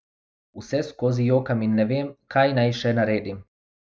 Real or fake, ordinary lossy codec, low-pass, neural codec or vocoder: real; none; none; none